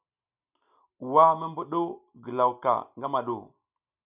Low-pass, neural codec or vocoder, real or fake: 3.6 kHz; none; real